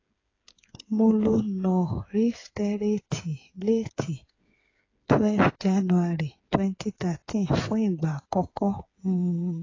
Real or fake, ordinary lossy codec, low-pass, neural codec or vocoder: fake; AAC, 32 kbps; 7.2 kHz; codec, 16 kHz, 8 kbps, FreqCodec, smaller model